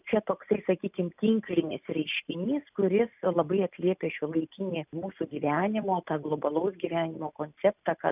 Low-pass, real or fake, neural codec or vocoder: 3.6 kHz; real; none